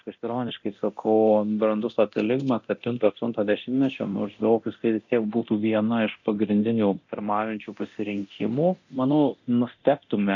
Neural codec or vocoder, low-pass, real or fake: codec, 24 kHz, 0.9 kbps, DualCodec; 7.2 kHz; fake